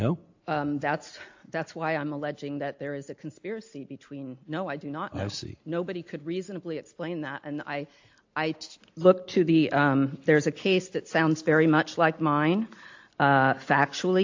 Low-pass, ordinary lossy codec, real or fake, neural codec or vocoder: 7.2 kHz; AAC, 48 kbps; real; none